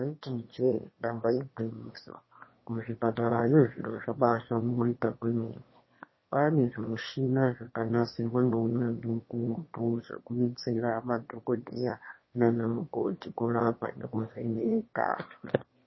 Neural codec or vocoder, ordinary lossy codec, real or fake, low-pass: autoencoder, 22.05 kHz, a latent of 192 numbers a frame, VITS, trained on one speaker; MP3, 24 kbps; fake; 7.2 kHz